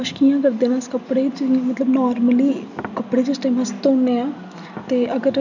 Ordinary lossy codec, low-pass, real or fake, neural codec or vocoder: none; 7.2 kHz; real; none